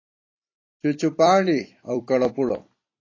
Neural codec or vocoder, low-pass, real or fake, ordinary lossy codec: vocoder, 44.1 kHz, 128 mel bands every 256 samples, BigVGAN v2; 7.2 kHz; fake; AAC, 32 kbps